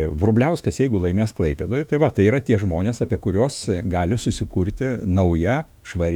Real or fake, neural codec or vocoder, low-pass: fake; autoencoder, 48 kHz, 32 numbers a frame, DAC-VAE, trained on Japanese speech; 19.8 kHz